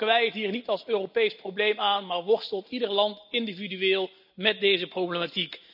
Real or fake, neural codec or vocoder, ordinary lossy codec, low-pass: real; none; none; 5.4 kHz